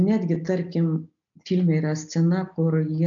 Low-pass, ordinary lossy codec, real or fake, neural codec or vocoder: 7.2 kHz; MP3, 64 kbps; real; none